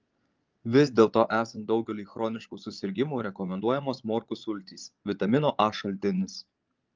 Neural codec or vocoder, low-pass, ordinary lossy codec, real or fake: vocoder, 22.05 kHz, 80 mel bands, Vocos; 7.2 kHz; Opus, 24 kbps; fake